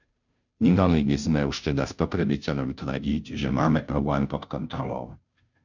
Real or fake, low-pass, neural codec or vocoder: fake; 7.2 kHz; codec, 16 kHz, 0.5 kbps, FunCodec, trained on Chinese and English, 25 frames a second